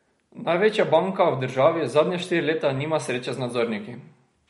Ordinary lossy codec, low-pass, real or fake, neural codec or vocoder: MP3, 48 kbps; 19.8 kHz; real; none